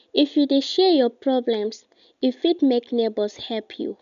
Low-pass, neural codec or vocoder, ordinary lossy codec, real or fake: 7.2 kHz; none; none; real